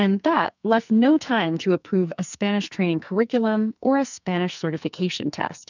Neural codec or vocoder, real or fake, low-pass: codec, 44.1 kHz, 2.6 kbps, SNAC; fake; 7.2 kHz